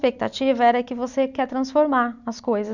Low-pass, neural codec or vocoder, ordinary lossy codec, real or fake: 7.2 kHz; none; none; real